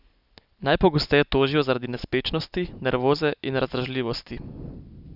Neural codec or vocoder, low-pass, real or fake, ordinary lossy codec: none; 5.4 kHz; real; none